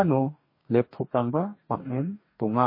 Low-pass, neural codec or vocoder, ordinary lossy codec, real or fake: 5.4 kHz; codec, 44.1 kHz, 2.6 kbps, DAC; MP3, 24 kbps; fake